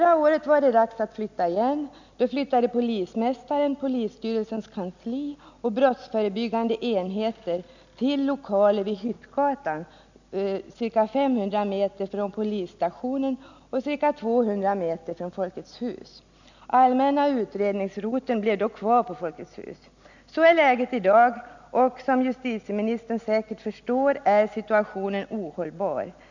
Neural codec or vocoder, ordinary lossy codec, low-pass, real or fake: none; none; 7.2 kHz; real